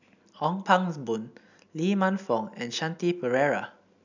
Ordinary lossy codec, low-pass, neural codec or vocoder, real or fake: none; 7.2 kHz; none; real